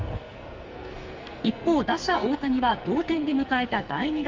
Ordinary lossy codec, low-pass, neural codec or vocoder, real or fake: Opus, 32 kbps; 7.2 kHz; codec, 44.1 kHz, 2.6 kbps, SNAC; fake